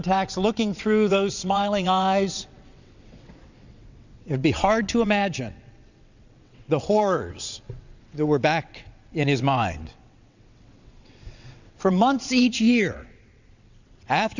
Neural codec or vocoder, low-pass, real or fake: vocoder, 22.05 kHz, 80 mel bands, WaveNeXt; 7.2 kHz; fake